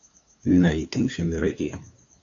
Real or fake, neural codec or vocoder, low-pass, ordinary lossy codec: fake; codec, 16 kHz, 2 kbps, FunCodec, trained on LibriTTS, 25 frames a second; 7.2 kHz; AAC, 48 kbps